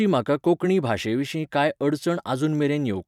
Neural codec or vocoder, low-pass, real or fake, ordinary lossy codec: vocoder, 44.1 kHz, 128 mel bands every 256 samples, BigVGAN v2; 19.8 kHz; fake; none